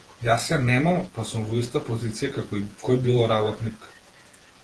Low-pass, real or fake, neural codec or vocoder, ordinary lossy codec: 10.8 kHz; fake; vocoder, 48 kHz, 128 mel bands, Vocos; Opus, 16 kbps